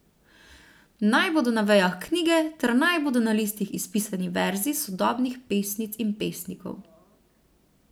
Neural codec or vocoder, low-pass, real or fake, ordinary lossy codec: none; none; real; none